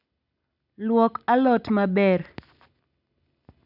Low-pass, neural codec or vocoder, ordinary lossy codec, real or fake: 5.4 kHz; none; none; real